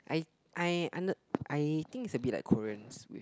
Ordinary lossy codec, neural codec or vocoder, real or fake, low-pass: none; none; real; none